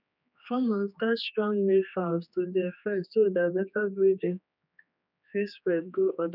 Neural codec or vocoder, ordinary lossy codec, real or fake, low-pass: codec, 16 kHz, 2 kbps, X-Codec, HuBERT features, trained on general audio; none; fake; 5.4 kHz